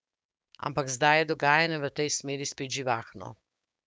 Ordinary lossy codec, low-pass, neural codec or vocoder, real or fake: none; none; codec, 16 kHz, 6 kbps, DAC; fake